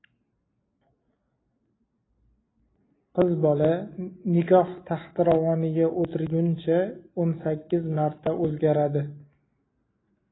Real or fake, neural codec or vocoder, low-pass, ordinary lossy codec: real; none; 7.2 kHz; AAC, 16 kbps